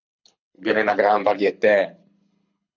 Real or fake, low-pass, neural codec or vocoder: fake; 7.2 kHz; codec, 24 kHz, 6 kbps, HILCodec